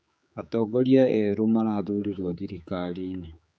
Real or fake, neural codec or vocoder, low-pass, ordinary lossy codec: fake; codec, 16 kHz, 4 kbps, X-Codec, HuBERT features, trained on general audio; none; none